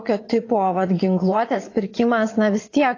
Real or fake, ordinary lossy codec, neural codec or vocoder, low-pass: fake; AAC, 32 kbps; vocoder, 22.05 kHz, 80 mel bands, Vocos; 7.2 kHz